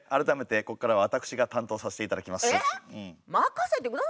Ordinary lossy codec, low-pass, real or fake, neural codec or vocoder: none; none; real; none